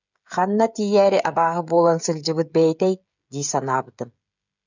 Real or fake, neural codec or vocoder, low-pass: fake; codec, 16 kHz, 16 kbps, FreqCodec, smaller model; 7.2 kHz